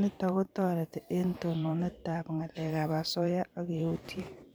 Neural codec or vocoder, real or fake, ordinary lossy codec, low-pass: vocoder, 44.1 kHz, 128 mel bands every 512 samples, BigVGAN v2; fake; none; none